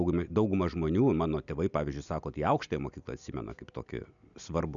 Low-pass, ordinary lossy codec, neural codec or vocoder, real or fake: 7.2 kHz; MP3, 96 kbps; none; real